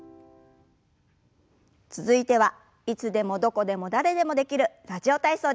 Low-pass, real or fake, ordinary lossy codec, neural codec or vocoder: none; real; none; none